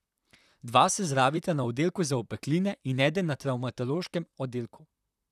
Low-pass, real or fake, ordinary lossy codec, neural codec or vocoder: 14.4 kHz; fake; none; vocoder, 44.1 kHz, 128 mel bands, Pupu-Vocoder